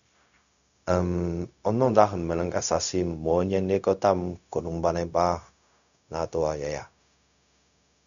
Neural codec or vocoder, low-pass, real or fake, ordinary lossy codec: codec, 16 kHz, 0.4 kbps, LongCat-Audio-Codec; 7.2 kHz; fake; none